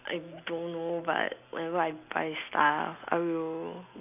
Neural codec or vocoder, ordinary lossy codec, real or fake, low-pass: none; none; real; 3.6 kHz